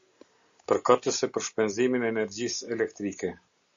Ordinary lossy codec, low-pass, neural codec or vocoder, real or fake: Opus, 64 kbps; 7.2 kHz; none; real